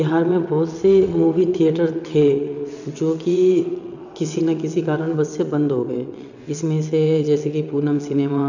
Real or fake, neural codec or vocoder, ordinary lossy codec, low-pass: fake; vocoder, 22.05 kHz, 80 mel bands, WaveNeXt; none; 7.2 kHz